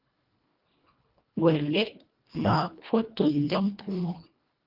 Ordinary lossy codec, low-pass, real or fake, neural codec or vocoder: Opus, 24 kbps; 5.4 kHz; fake; codec, 24 kHz, 1.5 kbps, HILCodec